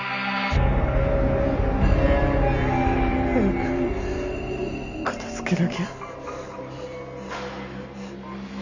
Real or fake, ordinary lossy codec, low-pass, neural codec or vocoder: real; none; 7.2 kHz; none